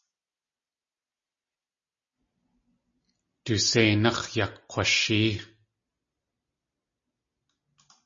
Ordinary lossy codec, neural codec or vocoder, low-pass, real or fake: MP3, 32 kbps; none; 7.2 kHz; real